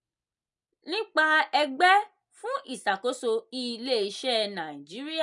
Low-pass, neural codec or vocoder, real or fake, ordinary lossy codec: 10.8 kHz; none; real; none